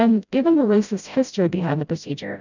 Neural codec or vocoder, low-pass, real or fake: codec, 16 kHz, 0.5 kbps, FreqCodec, smaller model; 7.2 kHz; fake